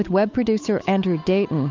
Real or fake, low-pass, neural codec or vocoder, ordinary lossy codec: fake; 7.2 kHz; codec, 16 kHz, 8 kbps, FunCodec, trained on Chinese and English, 25 frames a second; MP3, 64 kbps